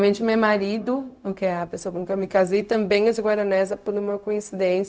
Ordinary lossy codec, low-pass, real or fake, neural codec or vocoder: none; none; fake; codec, 16 kHz, 0.4 kbps, LongCat-Audio-Codec